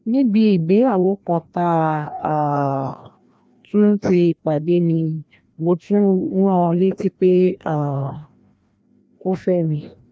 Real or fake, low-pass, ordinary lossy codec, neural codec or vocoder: fake; none; none; codec, 16 kHz, 1 kbps, FreqCodec, larger model